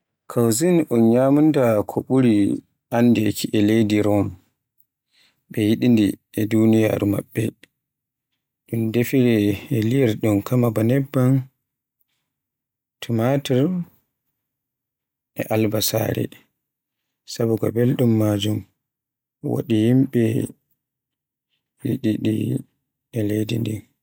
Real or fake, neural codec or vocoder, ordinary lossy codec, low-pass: real; none; none; 19.8 kHz